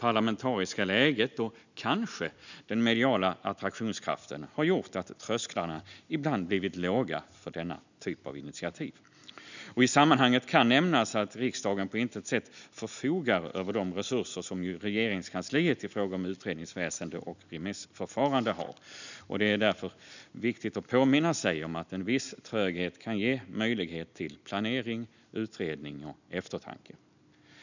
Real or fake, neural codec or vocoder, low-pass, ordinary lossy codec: real; none; 7.2 kHz; none